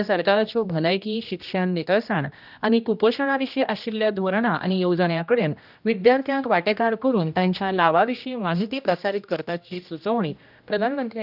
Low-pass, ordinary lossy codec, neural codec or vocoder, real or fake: 5.4 kHz; none; codec, 16 kHz, 1 kbps, X-Codec, HuBERT features, trained on general audio; fake